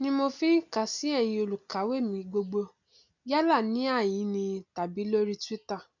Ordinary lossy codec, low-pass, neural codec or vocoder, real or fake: none; 7.2 kHz; none; real